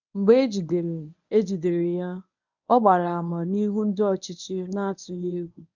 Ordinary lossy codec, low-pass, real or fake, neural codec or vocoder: none; 7.2 kHz; fake; codec, 24 kHz, 0.9 kbps, WavTokenizer, medium speech release version 1